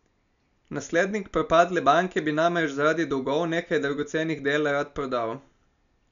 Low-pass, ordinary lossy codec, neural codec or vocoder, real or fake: 7.2 kHz; none; none; real